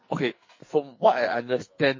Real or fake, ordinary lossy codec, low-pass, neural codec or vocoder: fake; MP3, 32 kbps; 7.2 kHz; codec, 44.1 kHz, 2.6 kbps, SNAC